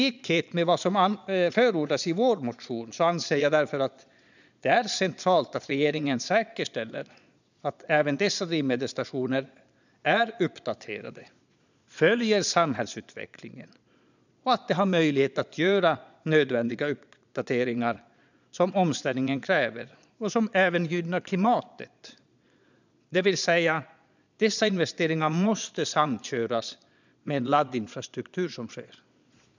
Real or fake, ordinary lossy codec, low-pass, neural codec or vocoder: fake; none; 7.2 kHz; vocoder, 22.05 kHz, 80 mel bands, Vocos